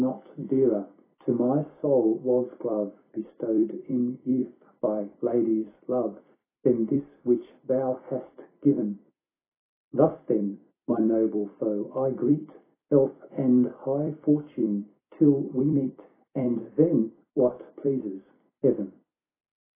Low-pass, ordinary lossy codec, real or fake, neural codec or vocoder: 3.6 kHz; AAC, 16 kbps; fake; vocoder, 44.1 kHz, 128 mel bands every 256 samples, BigVGAN v2